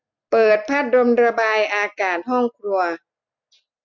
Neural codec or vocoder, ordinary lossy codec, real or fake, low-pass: none; MP3, 64 kbps; real; 7.2 kHz